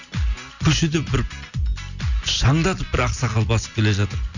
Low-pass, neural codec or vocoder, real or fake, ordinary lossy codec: 7.2 kHz; none; real; none